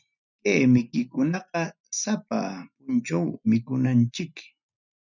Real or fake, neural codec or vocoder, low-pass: real; none; 7.2 kHz